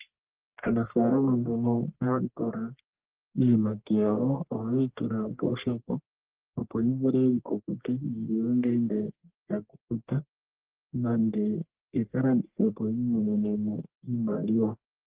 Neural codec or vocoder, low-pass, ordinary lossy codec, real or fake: codec, 44.1 kHz, 1.7 kbps, Pupu-Codec; 3.6 kHz; Opus, 16 kbps; fake